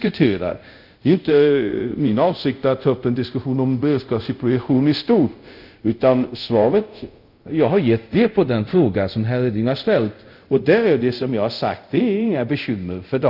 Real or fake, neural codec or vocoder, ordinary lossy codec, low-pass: fake; codec, 24 kHz, 0.5 kbps, DualCodec; none; 5.4 kHz